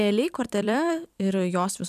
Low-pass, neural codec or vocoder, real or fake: 14.4 kHz; vocoder, 44.1 kHz, 128 mel bands every 256 samples, BigVGAN v2; fake